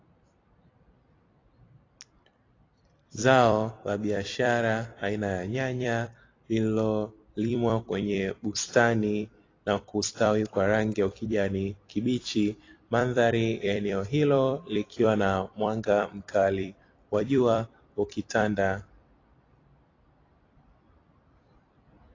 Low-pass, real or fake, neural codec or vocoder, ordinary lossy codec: 7.2 kHz; fake; vocoder, 44.1 kHz, 128 mel bands every 256 samples, BigVGAN v2; AAC, 32 kbps